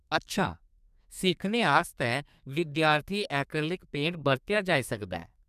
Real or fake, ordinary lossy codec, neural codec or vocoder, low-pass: fake; none; codec, 32 kHz, 1.9 kbps, SNAC; 14.4 kHz